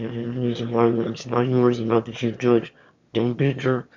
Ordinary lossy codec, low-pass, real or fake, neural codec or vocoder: MP3, 48 kbps; 7.2 kHz; fake; autoencoder, 22.05 kHz, a latent of 192 numbers a frame, VITS, trained on one speaker